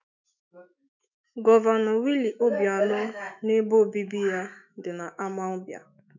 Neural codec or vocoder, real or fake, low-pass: autoencoder, 48 kHz, 128 numbers a frame, DAC-VAE, trained on Japanese speech; fake; 7.2 kHz